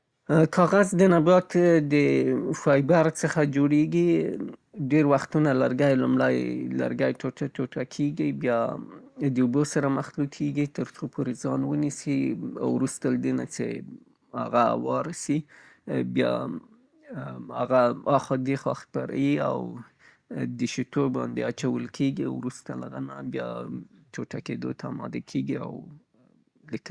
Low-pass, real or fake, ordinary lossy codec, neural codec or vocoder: 9.9 kHz; real; Opus, 64 kbps; none